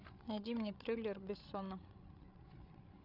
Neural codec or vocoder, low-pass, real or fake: codec, 16 kHz, 8 kbps, FreqCodec, larger model; 5.4 kHz; fake